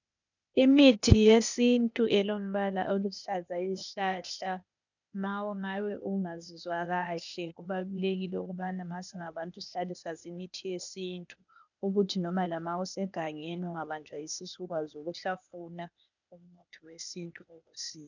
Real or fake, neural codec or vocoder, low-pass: fake; codec, 16 kHz, 0.8 kbps, ZipCodec; 7.2 kHz